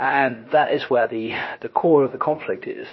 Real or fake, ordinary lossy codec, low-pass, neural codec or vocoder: fake; MP3, 24 kbps; 7.2 kHz; codec, 16 kHz, about 1 kbps, DyCAST, with the encoder's durations